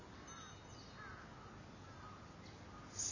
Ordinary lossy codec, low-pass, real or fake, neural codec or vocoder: none; 7.2 kHz; real; none